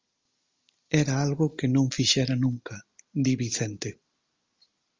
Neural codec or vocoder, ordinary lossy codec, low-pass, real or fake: none; Opus, 32 kbps; 7.2 kHz; real